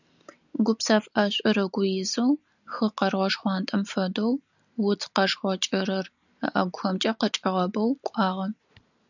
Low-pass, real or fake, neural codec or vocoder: 7.2 kHz; real; none